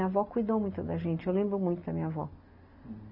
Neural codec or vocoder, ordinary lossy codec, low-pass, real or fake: none; none; 5.4 kHz; real